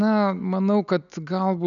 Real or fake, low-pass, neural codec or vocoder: real; 7.2 kHz; none